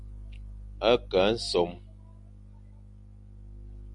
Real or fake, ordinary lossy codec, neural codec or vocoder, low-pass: real; MP3, 96 kbps; none; 10.8 kHz